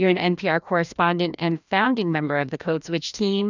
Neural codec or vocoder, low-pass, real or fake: codec, 16 kHz, 1 kbps, FreqCodec, larger model; 7.2 kHz; fake